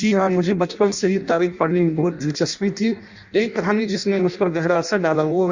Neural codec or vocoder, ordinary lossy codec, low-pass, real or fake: codec, 16 kHz in and 24 kHz out, 0.6 kbps, FireRedTTS-2 codec; Opus, 64 kbps; 7.2 kHz; fake